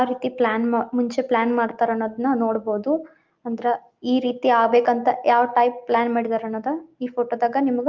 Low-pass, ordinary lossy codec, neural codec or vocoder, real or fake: 7.2 kHz; Opus, 32 kbps; none; real